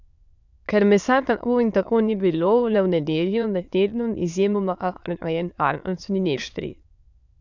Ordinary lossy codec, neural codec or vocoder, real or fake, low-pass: none; autoencoder, 22.05 kHz, a latent of 192 numbers a frame, VITS, trained on many speakers; fake; 7.2 kHz